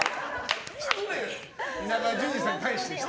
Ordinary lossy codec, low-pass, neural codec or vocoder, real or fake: none; none; none; real